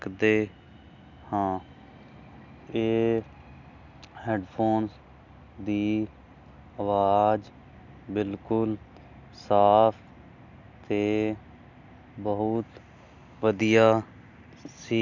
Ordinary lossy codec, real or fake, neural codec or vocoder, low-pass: none; real; none; 7.2 kHz